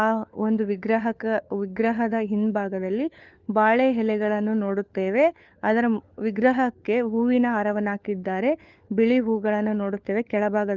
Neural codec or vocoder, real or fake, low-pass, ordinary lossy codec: codec, 16 kHz, 4 kbps, FunCodec, trained on LibriTTS, 50 frames a second; fake; 7.2 kHz; Opus, 32 kbps